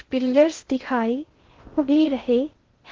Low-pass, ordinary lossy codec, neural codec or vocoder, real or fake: 7.2 kHz; Opus, 16 kbps; codec, 16 kHz in and 24 kHz out, 0.6 kbps, FocalCodec, streaming, 2048 codes; fake